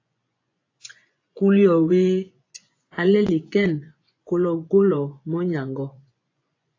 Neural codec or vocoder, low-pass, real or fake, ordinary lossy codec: vocoder, 24 kHz, 100 mel bands, Vocos; 7.2 kHz; fake; AAC, 32 kbps